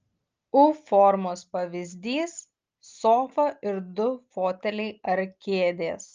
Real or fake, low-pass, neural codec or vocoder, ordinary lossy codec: real; 7.2 kHz; none; Opus, 24 kbps